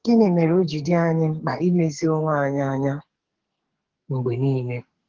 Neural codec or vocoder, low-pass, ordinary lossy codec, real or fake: codec, 44.1 kHz, 2.6 kbps, SNAC; 7.2 kHz; Opus, 16 kbps; fake